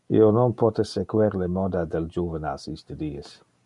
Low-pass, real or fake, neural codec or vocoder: 10.8 kHz; real; none